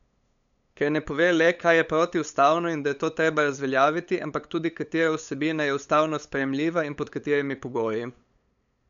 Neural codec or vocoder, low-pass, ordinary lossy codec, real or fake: codec, 16 kHz, 8 kbps, FunCodec, trained on LibriTTS, 25 frames a second; 7.2 kHz; none; fake